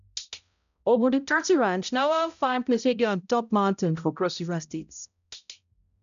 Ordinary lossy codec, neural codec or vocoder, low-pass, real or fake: none; codec, 16 kHz, 0.5 kbps, X-Codec, HuBERT features, trained on balanced general audio; 7.2 kHz; fake